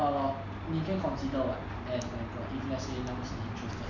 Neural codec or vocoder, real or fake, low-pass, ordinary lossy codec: none; real; 7.2 kHz; none